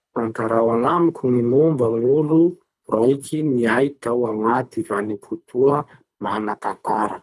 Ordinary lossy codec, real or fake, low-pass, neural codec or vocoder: none; fake; none; codec, 24 kHz, 3 kbps, HILCodec